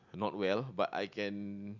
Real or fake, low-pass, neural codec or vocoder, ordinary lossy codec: real; 7.2 kHz; none; none